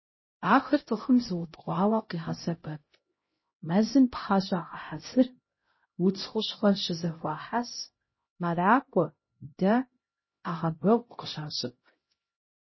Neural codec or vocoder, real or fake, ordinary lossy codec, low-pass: codec, 16 kHz, 0.5 kbps, X-Codec, HuBERT features, trained on LibriSpeech; fake; MP3, 24 kbps; 7.2 kHz